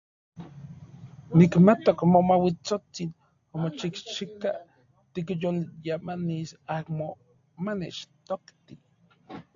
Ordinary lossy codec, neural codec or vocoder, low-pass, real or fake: AAC, 64 kbps; none; 7.2 kHz; real